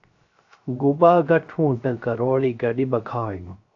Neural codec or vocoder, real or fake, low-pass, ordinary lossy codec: codec, 16 kHz, 0.3 kbps, FocalCodec; fake; 7.2 kHz; AAC, 48 kbps